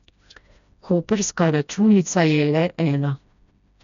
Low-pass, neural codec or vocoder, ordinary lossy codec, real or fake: 7.2 kHz; codec, 16 kHz, 1 kbps, FreqCodec, smaller model; none; fake